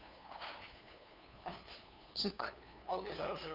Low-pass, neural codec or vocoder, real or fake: 5.4 kHz; codec, 16 kHz, 2 kbps, FunCodec, trained on LibriTTS, 25 frames a second; fake